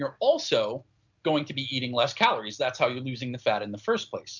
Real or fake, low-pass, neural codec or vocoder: real; 7.2 kHz; none